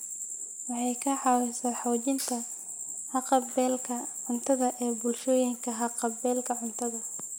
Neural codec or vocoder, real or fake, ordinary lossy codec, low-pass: none; real; none; none